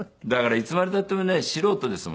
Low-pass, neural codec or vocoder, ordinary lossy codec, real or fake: none; none; none; real